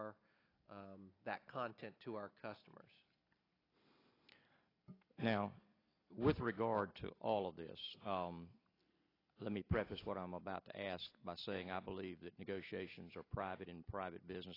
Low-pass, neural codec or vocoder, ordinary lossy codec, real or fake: 5.4 kHz; none; AAC, 24 kbps; real